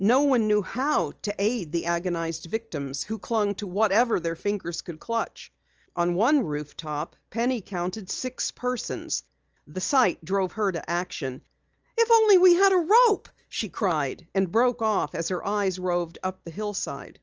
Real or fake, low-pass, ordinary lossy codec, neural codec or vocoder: real; 7.2 kHz; Opus, 24 kbps; none